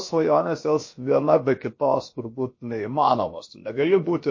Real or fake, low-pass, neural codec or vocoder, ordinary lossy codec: fake; 7.2 kHz; codec, 16 kHz, about 1 kbps, DyCAST, with the encoder's durations; MP3, 32 kbps